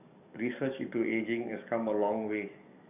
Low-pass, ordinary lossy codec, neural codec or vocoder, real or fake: 3.6 kHz; none; codec, 44.1 kHz, 7.8 kbps, DAC; fake